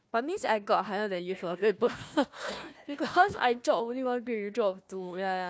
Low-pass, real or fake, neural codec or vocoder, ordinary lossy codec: none; fake; codec, 16 kHz, 1 kbps, FunCodec, trained on Chinese and English, 50 frames a second; none